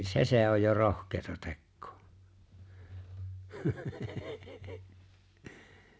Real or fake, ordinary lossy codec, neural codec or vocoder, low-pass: real; none; none; none